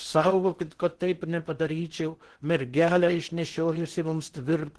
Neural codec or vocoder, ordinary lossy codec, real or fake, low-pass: codec, 16 kHz in and 24 kHz out, 0.8 kbps, FocalCodec, streaming, 65536 codes; Opus, 16 kbps; fake; 10.8 kHz